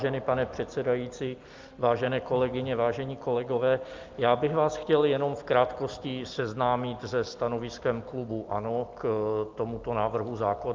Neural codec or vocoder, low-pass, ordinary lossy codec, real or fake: none; 7.2 kHz; Opus, 24 kbps; real